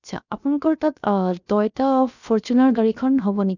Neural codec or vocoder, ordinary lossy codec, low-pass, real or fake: codec, 16 kHz, 0.7 kbps, FocalCodec; none; 7.2 kHz; fake